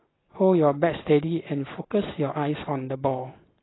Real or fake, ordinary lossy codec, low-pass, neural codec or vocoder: real; AAC, 16 kbps; 7.2 kHz; none